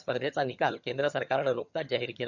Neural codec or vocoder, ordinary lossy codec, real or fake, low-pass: vocoder, 22.05 kHz, 80 mel bands, HiFi-GAN; none; fake; 7.2 kHz